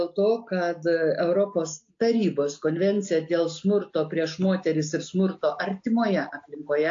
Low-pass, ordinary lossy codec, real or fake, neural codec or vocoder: 7.2 kHz; AAC, 48 kbps; real; none